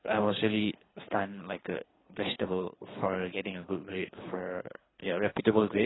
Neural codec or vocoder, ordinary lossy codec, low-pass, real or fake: codec, 24 kHz, 3 kbps, HILCodec; AAC, 16 kbps; 7.2 kHz; fake